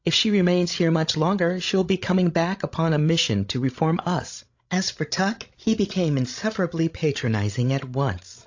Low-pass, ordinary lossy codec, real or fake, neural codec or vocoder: 7.2 kHz; AAC, 48 kbps; fake; codec, 16 kHz, 16 kbps, FreqCodec, larger model